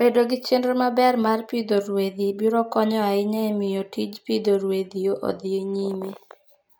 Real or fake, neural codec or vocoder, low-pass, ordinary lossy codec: real; none; none; none